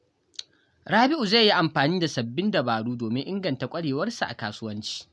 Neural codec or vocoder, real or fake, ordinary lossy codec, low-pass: none; real; none; none